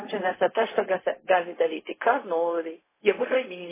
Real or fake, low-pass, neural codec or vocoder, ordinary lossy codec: fake; 3.6 kHz; codec, 16 kHz, 0.4 kbps, LongCat-Audio-Codec; MP3, 16 kbps